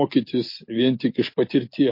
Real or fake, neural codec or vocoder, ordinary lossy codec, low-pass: real; none; MP3, 32 kbps; 5.4 kHz